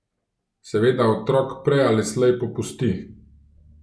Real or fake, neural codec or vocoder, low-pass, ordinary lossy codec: real; none; none; none